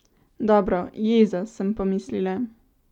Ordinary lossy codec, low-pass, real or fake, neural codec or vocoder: none; 19.8 kHz; real; none